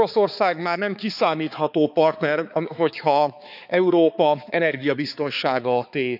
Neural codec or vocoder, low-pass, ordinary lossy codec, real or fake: codec, 16 kHz, 4 kbps, X-Codec, HuBERT features, trained on balanced general audio; 5.4 kHz; none; fake